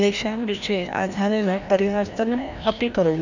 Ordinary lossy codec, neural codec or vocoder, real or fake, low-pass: none; codec, 16 kHz, 1 kbps, FreqCodec, larger model; fake; 7.2 kHz